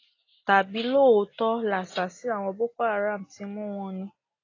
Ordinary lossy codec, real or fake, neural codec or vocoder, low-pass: AAC, 32 kbps; real; none; 7.2 kHz